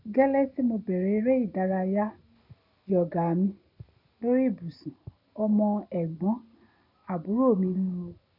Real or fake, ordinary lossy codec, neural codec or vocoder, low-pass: real; none; none; 5.4 kHz